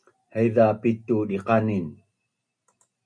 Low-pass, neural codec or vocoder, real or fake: 9.9 kHz; none; real